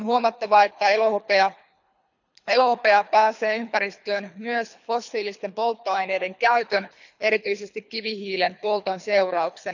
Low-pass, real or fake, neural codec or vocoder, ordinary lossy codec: 7.2 kHz; fake; codec, 24 kHz, 3 kbps, HILCodec; none